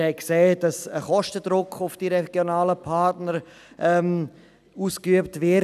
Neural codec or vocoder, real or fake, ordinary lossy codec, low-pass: none; real; none; 14.4 kHz